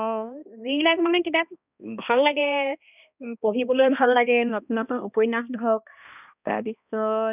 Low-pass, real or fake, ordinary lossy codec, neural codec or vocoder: 3.6 kHz; fake; none; codec, 16 kHz, 1 kbps, X-Codec, HuBERT features, trained on balanced general audio